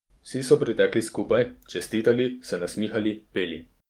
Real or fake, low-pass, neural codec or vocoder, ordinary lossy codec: fake; 19.8 kHz; codec, 44.1 kHz, 7.8 kbps, DAC; Opus, 32 kbps